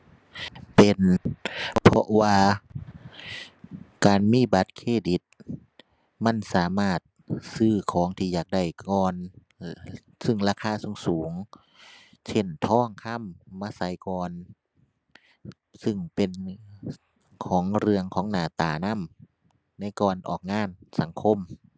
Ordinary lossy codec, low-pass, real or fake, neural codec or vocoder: none; none; real; none